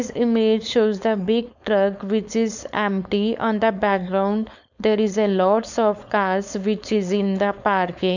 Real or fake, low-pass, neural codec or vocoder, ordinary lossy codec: fake; 7.2 kHz; codec, 16 kHz, 4.8 kbps, FACodec; none